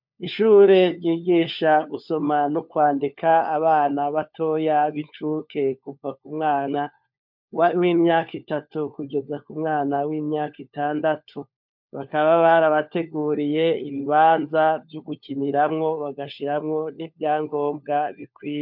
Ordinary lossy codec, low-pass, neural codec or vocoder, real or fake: MP3, 48 kbps; 5.4 kHz; codec, 16 kHz, 4 kbps, FunCodec, trained on LibriTTS, 50 frames a second; fake